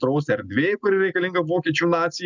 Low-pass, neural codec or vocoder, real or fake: 7.2 kHz; none; real